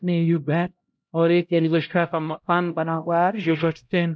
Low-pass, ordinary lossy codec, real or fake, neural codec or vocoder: none; none; fake; codec, 16 kHz, 0.5 kbps, X-Codec, HuBERT features, trained on LibriSpeech